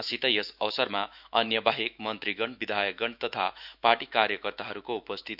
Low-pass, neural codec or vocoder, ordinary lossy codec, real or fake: 5.4 kHz; autoencoder, 48 kHz, 128 numbers a frame, DAC-VAE, trained on Japanese speech; none; fake